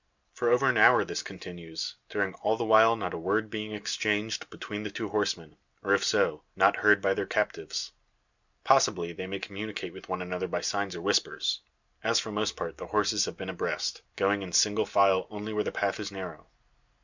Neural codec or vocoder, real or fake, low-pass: none; real; 7.2 kHz